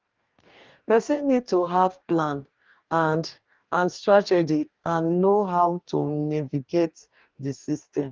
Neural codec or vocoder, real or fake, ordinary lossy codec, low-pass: codec, 44.1 kHz, 2.6 kbps, DAC; fake; Opus, 32 kbps; 7.2 kHz